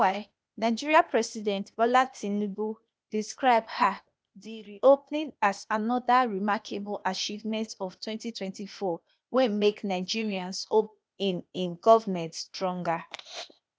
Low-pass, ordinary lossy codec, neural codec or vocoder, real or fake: none; none; codec, 16 kHz, 0.8 kbps, ZipCodec; fake